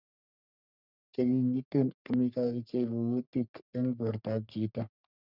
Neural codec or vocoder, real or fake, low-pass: codec, 44.1 kHz, 3.4 kbps, Pupu-Codec; fake; 5.4 kHz